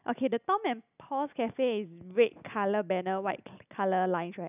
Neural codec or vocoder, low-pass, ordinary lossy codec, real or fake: none; 3.6 kHz; none; real